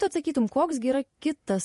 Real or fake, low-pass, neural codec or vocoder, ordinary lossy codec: fake; 14.4 kHz; vocoder, 48 kHz, 128 mel bands, Vocos; MP3, 48 kbps